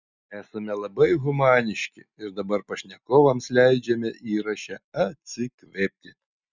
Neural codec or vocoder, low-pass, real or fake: none; 7.2 kHz; real